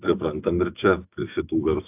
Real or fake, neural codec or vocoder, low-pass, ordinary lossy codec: fake; autoencoder, 48 kHz, 128 numbers a frame, DAC-VAE, trained on Japanese speech; 3.6 kHz; AAC, 32 kbps